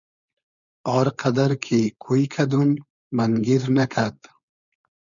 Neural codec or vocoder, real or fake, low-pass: codec, 16 kHz, 4.8 kbps, FACodec; fake; 7.2 kHz